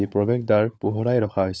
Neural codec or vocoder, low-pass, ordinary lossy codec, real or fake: codec, 16 kHz, 16 kbps, FunCodec, trained on LibriTTS, 50 frames a second; none; none; fake